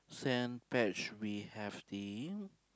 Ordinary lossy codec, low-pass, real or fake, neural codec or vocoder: none; none; real; none